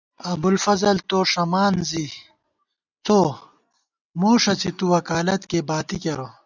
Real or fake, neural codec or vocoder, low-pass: real; none; 7.2 kHz